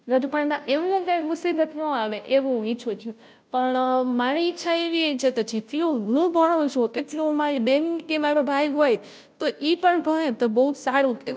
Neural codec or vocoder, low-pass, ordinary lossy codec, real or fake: codec, 16 kHz, 0.5 kbps, FunCodec, trained on Chinese and English, 25 frames a second; none; none; fake